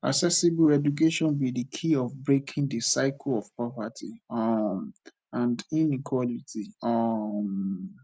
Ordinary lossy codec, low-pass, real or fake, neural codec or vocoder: none; none; real; none